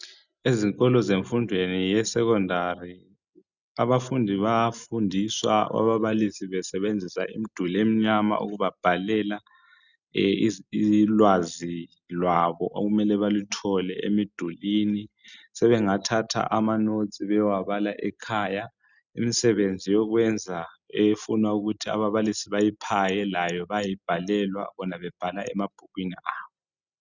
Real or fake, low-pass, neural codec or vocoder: real; 7.2 kHz; none